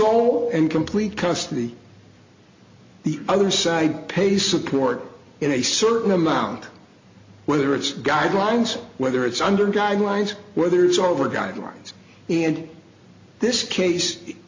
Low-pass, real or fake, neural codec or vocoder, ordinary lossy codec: 7.2 kHz; real; none; MP3, 48 kbps